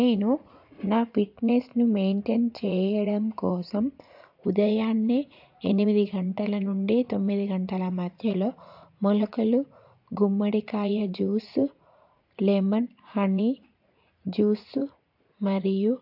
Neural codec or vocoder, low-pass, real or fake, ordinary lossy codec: vocoder, 44.1 kHz, 80 mel bands, Vocos; 5.4 kHz; fake; AAC, 48 kbps